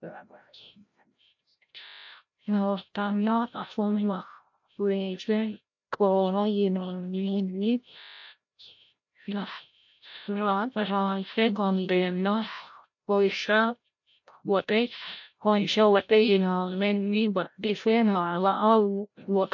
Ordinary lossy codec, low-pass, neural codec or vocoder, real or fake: MP3, 48 kbps; 7.2 kHz; codec, 16 kHz, 0.5 kbps, FreqCodec, larger model; fake